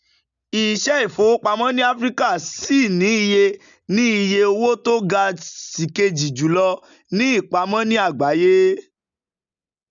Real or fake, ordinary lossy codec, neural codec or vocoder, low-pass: real; none; none; 7.2 kHz